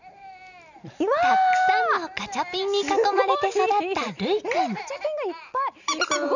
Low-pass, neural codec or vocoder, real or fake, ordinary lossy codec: 7.2 kHz; none; real; none